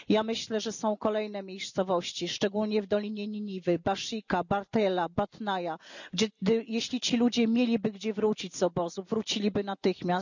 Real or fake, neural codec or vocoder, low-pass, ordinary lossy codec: real; none; 7.2 kHz; none